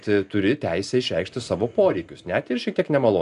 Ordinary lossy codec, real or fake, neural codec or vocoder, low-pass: AAC, 96 kbps; real; none; 10.8 kHz